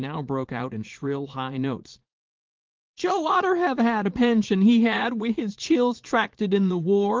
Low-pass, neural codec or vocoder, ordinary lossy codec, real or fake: 7.2 kHz; vocoder, 44.1 kHz, 80 mel bands, Vocos; Opus, 16 kbps; fake